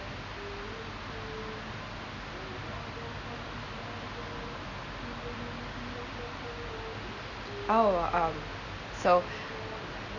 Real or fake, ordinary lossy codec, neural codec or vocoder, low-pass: real; none; none; 7.2 kHz